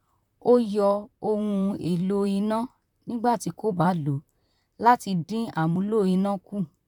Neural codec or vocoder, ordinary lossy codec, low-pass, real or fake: vocoder, 44.1 kHz, 128 mel bands, Pupu-Vocoder; none; 19.8 kHz; fake